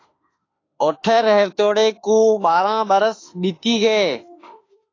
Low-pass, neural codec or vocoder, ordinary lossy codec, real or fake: 7.2 kHz; autoencoder, 48 kHz, 32 numbers a frame, DAC-VAE, trained on Japanese speech; AAC, 32 kbps; fake